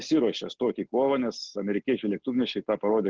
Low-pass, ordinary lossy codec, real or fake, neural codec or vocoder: 7.2 kHz; Opus, 16 kbps; real; none